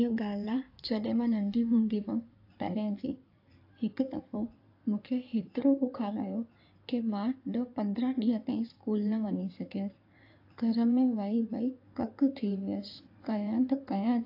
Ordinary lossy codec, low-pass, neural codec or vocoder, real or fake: AAC, 32 kbps; 5.4 kHz; codec, 16 kHz in and 24 kHz out, 2.2 kbps, FireRedTTS-2 codec; fake